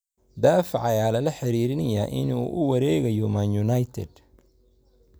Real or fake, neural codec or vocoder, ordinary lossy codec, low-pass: real; none; none; none